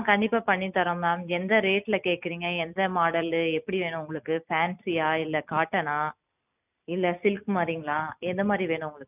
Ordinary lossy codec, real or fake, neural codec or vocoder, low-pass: none; real; none; 3.6 kHz